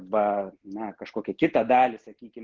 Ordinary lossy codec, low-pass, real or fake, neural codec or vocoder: Opus, 16 kbps; 7.2 kHz; real; none